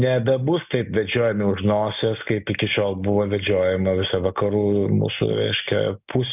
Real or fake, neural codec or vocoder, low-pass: real; none; 3.6 kHz